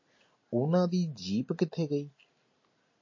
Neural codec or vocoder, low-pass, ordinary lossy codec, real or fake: none; 7.2 kHz; MP3, 32 kbps; real